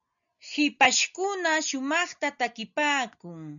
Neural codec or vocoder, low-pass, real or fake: none; 7.2 kHz; real